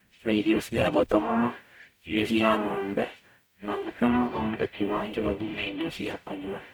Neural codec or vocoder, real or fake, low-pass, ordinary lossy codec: codec, 44.1 kHz, 0.9 kbps, DAC; fake; none; none